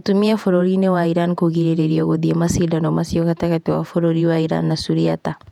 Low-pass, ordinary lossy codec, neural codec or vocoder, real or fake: 19.8 kHz; none; vocoder, 48 kHz, 128 mel bands, Vocos; fake